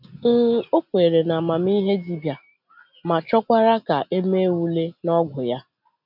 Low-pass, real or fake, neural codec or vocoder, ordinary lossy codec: 5.4 kHz; real; none; none